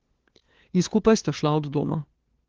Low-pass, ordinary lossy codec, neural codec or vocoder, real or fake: 7.2 kHz; Opus, 16 kbps; codec, 16 kHz, 2 kbps, FunCodec, trained on LibriTTS, 25 frames a second; fake